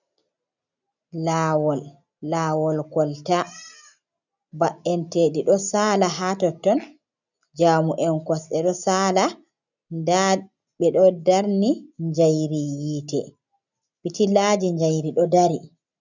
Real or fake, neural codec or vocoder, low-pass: real; none; 7.2 kHz